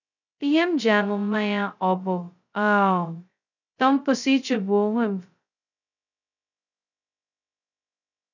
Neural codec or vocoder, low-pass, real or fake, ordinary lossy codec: codec, 16 kHz, 0.2 kbps, FocalCodec; 7.2 kHz; fake; none